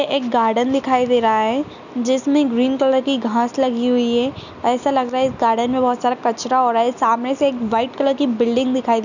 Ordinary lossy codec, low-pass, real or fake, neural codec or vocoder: none; 7.2 kHz; real; none